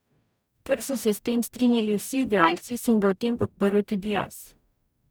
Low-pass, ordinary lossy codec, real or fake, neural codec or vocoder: none; none; fake; codec, 44.1 kHz, 0.9 kbps, DAC